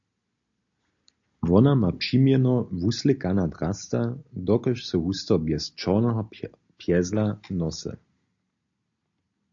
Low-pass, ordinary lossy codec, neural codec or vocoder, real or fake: 7.2 kHz; AAC, 64 kbps; none; real